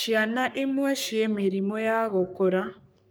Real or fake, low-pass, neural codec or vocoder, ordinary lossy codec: fake; none; codec, 44.1 kHz, 3.4 kbps, Pupu-Codec; none